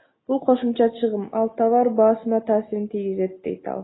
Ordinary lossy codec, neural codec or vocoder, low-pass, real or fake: AAC, 16 kbps; none; 7.2 kHz; real